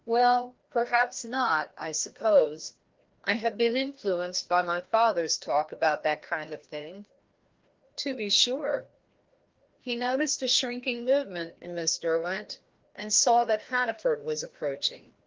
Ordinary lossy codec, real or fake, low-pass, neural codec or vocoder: Opus, 16 kbps; fake; 7.2 kHz; codec, 16 kHz, 1 kbps, FreqCodec, larger model